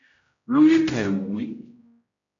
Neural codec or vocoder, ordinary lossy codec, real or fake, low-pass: codec, 16 kHz, 0.5 kbps, X-Codec, HuBERT features, trained on general audio; MP3, 64 kbps; fake; 7.2 kHz